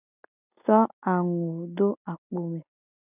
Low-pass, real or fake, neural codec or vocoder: 3.6 kHz; real; none